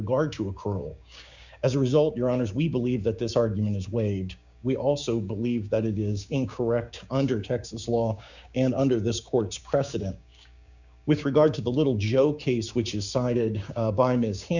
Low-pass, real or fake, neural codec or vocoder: 7.2 kHz; fake; codec, 16 kHz, 6 kbps, DAC